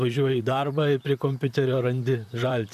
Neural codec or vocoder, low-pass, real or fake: vocoder, 44.1 kHz, 128 mel bands, Pupu-Vocoder; 14.4 kHz; fake